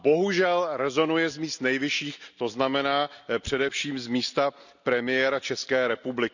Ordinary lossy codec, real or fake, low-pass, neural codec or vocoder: none; real; 7.2 kHz; none